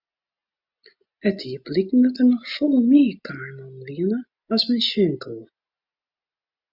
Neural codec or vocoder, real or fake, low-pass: none; real; 5.4 kHz